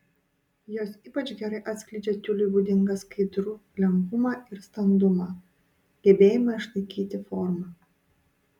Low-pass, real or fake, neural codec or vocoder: 19.8 kHz; real; none